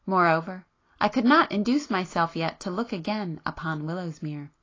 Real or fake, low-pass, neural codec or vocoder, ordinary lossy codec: real; 7.2 kHz; none; AAC, 32 kbps